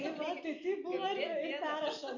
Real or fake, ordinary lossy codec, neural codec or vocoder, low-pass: real; Opus, 64 kbps; none; 7.2 kHz